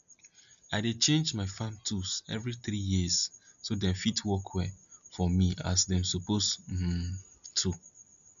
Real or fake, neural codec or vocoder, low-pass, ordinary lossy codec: real; none; 7.2 kHz; none